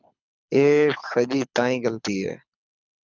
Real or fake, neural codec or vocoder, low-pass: fake; codec, 24 kHz, 6 kbps, HILCodec; 7.2 kHz